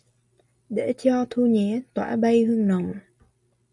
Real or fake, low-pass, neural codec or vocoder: real; 10.8 kHz; none